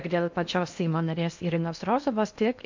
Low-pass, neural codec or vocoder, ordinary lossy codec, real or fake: 7.2 kHz; codec, 16 kHz in and 24 kHz out, 0.8 kbps, FocalCodec, streaming, 65536 codes; MP3, 64 kbps; fake